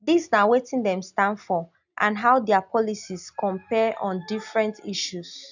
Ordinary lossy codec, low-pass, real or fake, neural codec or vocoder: none; 7.2 kHz; real; none